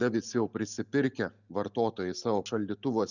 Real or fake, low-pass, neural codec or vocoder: real; 7.2 kHz; none